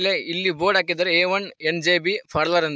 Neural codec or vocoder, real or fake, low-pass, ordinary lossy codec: none; real; none; none